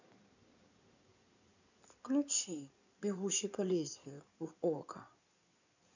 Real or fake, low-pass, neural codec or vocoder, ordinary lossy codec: fake; 7.2 kHz; codec, 16 kHz in and 24 kHz out, 2.2 kbps, FireRedTTS-2 codec; none